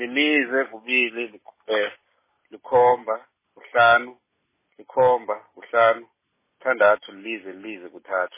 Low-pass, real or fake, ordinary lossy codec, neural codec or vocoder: 3.6 kHz; real; MP3, 16 kbps; none